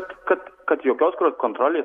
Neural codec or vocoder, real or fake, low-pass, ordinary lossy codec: none; real; 19.8 kHz; MP3, 64 kbps